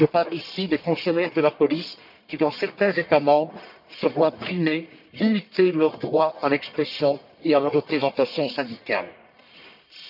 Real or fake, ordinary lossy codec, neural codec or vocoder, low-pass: fake; none; codec, 44.1 kHz, 1.7 kbps, Pupu-Codec; 5.4 kHz